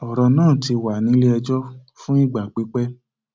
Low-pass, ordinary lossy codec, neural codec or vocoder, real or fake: none; none; none; real